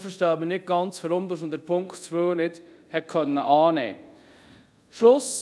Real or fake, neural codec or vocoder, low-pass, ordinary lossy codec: fake; codec, 24 kHz, 0.5 kbps, DualCodec; none; none